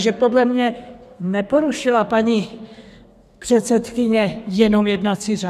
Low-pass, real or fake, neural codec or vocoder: 14.4 kHz; fake; codec, 32 kHz, 1.9 kbps, SNAC